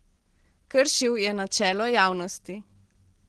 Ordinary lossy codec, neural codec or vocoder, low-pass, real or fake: Opus, 16 kbps; none; 10.8 kHz; real